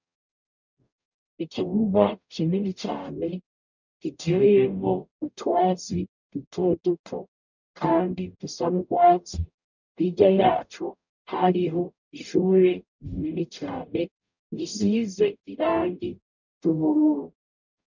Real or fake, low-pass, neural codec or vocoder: fake; 7.2 kHz; codec, 44.1 kHz, 0.9 kbps, DAC